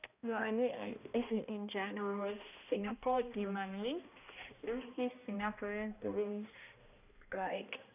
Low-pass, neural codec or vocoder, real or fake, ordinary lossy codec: 3.6 kHz; codec, 16 kHz, 1 kbps, X-Codec, HuBERT features, trained on balanced general audio; fake; none